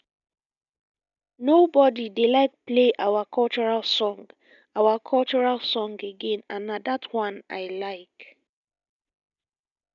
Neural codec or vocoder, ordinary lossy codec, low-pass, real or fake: none; none; 7.2 kHz; real